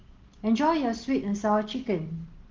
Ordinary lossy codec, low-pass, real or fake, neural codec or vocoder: Opus, 16 kbps; 7.2 kHz; real; none